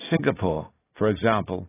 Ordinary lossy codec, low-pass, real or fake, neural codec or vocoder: AAC, 16 kbps; 3.6 kHz; real; none